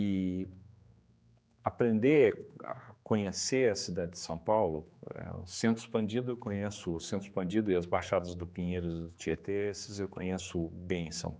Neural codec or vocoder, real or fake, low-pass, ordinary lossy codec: codec, 16 kHz, 4 kbps, X-Codec, HuBERT features, trained on general audio; fake; none; none